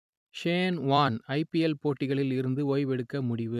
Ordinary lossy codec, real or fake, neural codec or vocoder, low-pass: none; fake; vocoder, 44.1 kHz, 128 mel bands every 256 samples, BigVGAN v2; 14.4 kHz